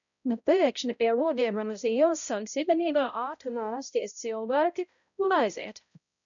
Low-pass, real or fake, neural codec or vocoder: 7.2 kHz; fake; codec, 16 kHz, 0.5 kbps, X-Codec, HuBERT features, trained on balanced general audio